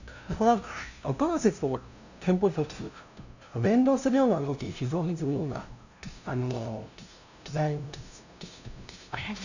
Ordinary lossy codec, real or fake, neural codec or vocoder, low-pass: none; fake; codec, 16 kHz, 0.5 kbps, FunCodec, trained on LibriTTS, 25 frames a second; 7.2 kHz